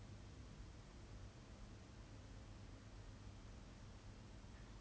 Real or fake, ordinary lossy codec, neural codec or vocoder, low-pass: real; none; none; none